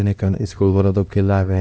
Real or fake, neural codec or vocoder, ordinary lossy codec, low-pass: fake; codec, 16 kHz, 1 kbps, X-Codec, HuBERT features, trained on LibriSpeech; none; none